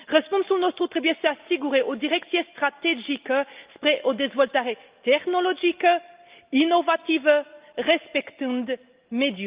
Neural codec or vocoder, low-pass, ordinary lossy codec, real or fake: none; 3.6 kHz; Opus, 32 kbps; real